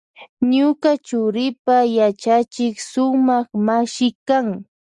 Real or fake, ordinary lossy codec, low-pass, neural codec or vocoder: real; Opus, 64 kbps; 10.8 kHz; none